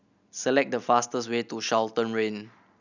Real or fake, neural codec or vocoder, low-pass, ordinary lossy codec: real; none; 7.2 kHz; none